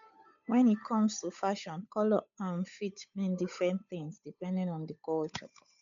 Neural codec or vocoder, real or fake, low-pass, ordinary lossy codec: codec, 16 kHz, 8 kbps, FunCodec, trained on Chinese and English, 25 frames a second; fake; 7.2 kHz; none